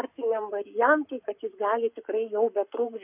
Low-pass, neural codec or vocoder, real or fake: 3.6 kHz; codec, 44.1 kHz, 7.8 kbps, Pupu-Codec; fake